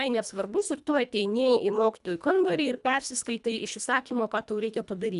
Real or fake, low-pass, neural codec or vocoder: fake; 10.8 kHz; codec, 24 kHz, 1.5 kbps, HILCodec